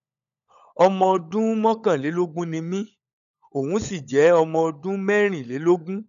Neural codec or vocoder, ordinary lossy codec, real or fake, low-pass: codec, 16 kHz, 16 kbps, FunCodec, trained on LibriTTS, 50 frames a second; none; fake; 7.2 kHz